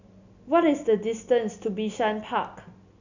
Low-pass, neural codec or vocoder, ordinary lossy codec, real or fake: 7.2 kHz; none; none; real